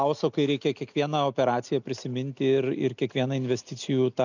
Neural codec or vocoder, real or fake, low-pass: none; real; 7.2 kHz